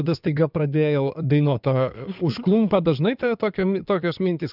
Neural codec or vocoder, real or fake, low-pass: codec, 16 kHz in and 24 kHz out, 2.2 kbps, FireRedTTS-2 codec; fake; 5.4 kHz